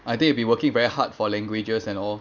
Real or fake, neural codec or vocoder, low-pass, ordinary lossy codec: real; none; 7.2 kHz; none